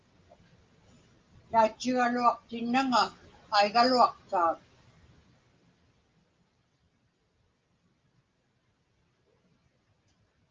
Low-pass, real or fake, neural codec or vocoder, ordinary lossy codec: 7.2 kHz; real; none; Opus, 24 kbps